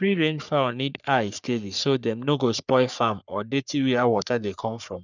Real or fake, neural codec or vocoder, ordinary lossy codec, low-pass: fake; codec, 44.1 kHz, 3.4 kbps, Pupu-Codec; none; 7.2 kHz